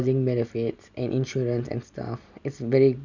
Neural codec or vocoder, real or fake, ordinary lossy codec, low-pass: none; real; none; 7.2 kHz